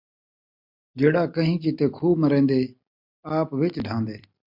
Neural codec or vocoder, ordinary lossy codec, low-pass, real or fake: none; MP3, 48 kbps; 5.4 kHz; real